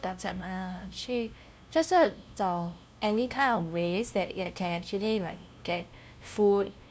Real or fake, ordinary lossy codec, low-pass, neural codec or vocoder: fake; none; none; codec, 16 kHz, 0.5 kbps, FunCodec, trained on LibriTTS, 25 frames a second